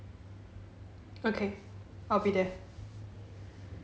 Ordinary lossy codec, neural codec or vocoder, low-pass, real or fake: none; none; none; real